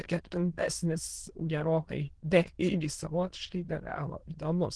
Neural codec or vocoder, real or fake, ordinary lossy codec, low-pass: autoencoder, 22.05 kHz, a latent of 192 numbers a frame, VITS, trained on many speakers; fake; Opus, 16 kbps; 9.9 kHz